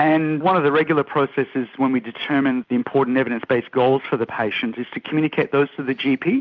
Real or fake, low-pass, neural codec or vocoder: real; 7.2 kHz; none